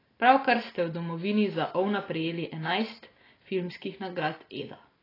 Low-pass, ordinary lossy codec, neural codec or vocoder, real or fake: 5.4 kHz; AAC, 24 kbps; none; real